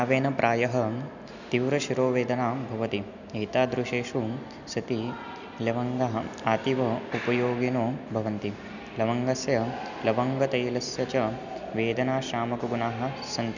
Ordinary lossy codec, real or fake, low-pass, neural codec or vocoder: none; real; 7.2 kHz; none